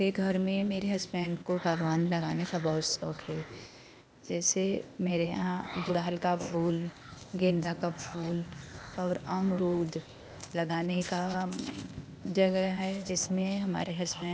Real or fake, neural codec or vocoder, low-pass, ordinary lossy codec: fake; codec, 16 kHz, 0.8 kbps, ZipCodec; none; none